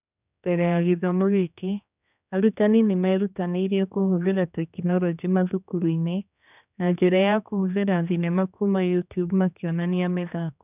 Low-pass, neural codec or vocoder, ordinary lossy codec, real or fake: 3.6 kHz; codec, 16 kHz, 2 kbps, X-Codec, HuBERT features, trained on general audio; none; fake